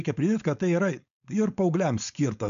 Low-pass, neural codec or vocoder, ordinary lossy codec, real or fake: 7.2 kHz; codec, 16 kHz, 4.8 kbps, FACodec; AAC, 96 kbps; fake